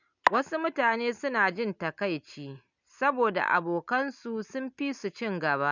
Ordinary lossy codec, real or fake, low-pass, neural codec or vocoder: none; real; 7.2 kHz; none